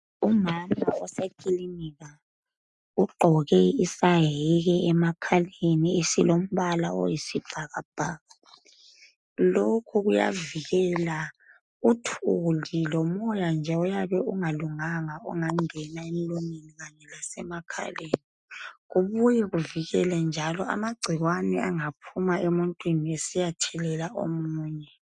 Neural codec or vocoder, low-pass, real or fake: none; 10.8 kHz; real